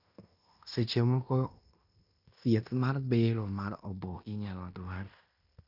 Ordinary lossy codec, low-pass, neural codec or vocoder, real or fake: none; 5.4 kHz; codec, 16 kHz in and 24 kHz out, 0.9 kbps, LongCat-Audio-Codec, fine tuned four codebook decoder; fake